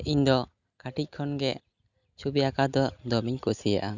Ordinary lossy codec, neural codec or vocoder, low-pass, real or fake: none; none; 7.2 kHz; real